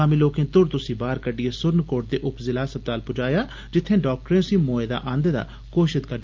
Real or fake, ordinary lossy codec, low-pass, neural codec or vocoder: real; Opus, 24 kbps; 7.2 kHz; none